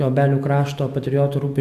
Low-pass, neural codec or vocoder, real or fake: 14.4 kHz; autoencoder, 48 kHz, 128 numbers a frame, DAC-VAE, trained on Japanese speech; fake